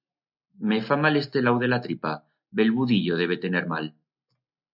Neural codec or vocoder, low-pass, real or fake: none; 5.4 kHz; real